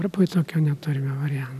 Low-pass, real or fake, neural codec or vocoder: 14.4 kHz; real; none